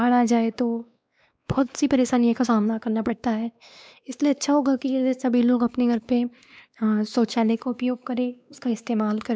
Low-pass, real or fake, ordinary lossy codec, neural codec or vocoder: none; fake; none; codec, 16 kHz, 2 kbps, X-Codec, WavLM features, trained on Multilingual LibriSpeech